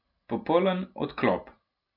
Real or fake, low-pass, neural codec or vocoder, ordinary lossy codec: real; 5.4 kHz; none; none